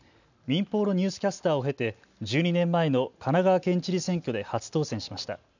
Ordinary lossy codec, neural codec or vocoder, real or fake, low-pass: none; none; real; 7.2 kHz